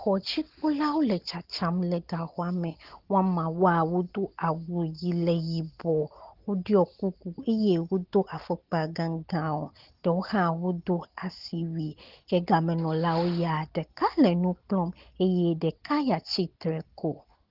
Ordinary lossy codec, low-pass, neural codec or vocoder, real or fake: Opus, 16 kbps; 5.4 kHz; none; real